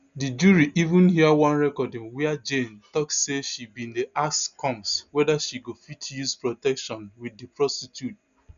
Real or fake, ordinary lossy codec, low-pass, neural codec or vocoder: real; none; 7.2 kHz; none